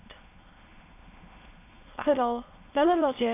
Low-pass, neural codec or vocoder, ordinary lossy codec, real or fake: 3.6 kHz; autoencoder, 22.05 kHz, a latent of 192 numbers a frame, VITS, trained on many speakers; AAC, 24 kbps; fake